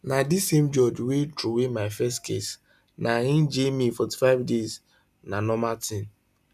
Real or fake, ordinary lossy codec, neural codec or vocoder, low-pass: real; none; none; 14.4 kHz